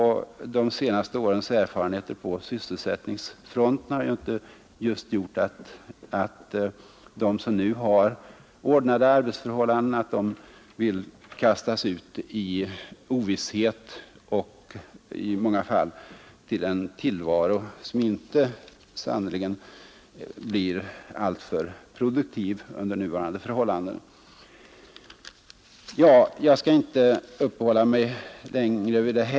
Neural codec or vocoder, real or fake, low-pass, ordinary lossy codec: none; real; none; none